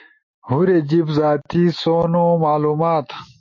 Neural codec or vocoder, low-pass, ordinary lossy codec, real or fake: autoencoder, 48 kHz, 128 numbers a frame, DAC-VAE, trained on Japanese speech; 7.2 kHz; MP3, 32 kbps; fake